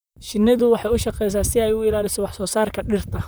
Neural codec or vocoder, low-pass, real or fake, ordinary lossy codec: vocoder, 44.1 kHz, 128 mel bands, Pupu-Vocoder; none; fake; none